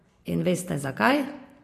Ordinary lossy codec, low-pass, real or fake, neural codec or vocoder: AAC, 48 kbps; 14.4 kHz; fake; vocoder, 44.1 kHz, 128 mel bands every 512 samples, BigVGAN v2